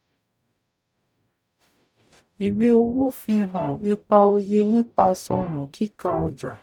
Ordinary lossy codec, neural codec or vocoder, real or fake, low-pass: none; codec, 44.1 kHz, 0.9 kbps, DAC; fake; 19.8 kHz